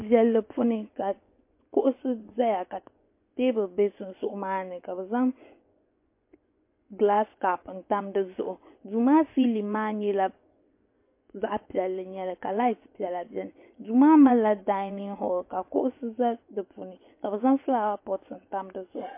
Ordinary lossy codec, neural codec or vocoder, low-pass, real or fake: MP3, 24 kbps; vocoder, 24 kHz, 100 mel bands, Vocos; 3.6 kHz; fake